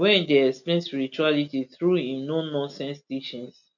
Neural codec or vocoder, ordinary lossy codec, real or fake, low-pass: none; none; real; 7.2 kHz